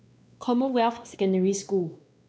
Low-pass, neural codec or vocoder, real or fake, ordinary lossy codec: none; codec, 16 kHz, 2 kbps, X-Codec, WavLM features, trained on Multilingual LibriSpeech; fake; none